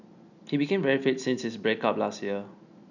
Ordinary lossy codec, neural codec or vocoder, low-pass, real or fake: none; none; 7.2 kHz; real